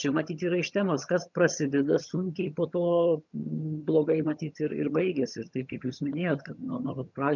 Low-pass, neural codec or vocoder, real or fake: 7.2 kHz; vocoder, 22.05 kHz, 80 mel bands, HiFi-GAN; fake